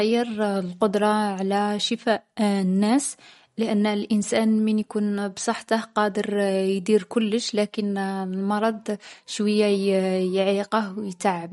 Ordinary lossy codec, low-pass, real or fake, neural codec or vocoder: MP3, 48 kbps; 19.8 kHz; real; none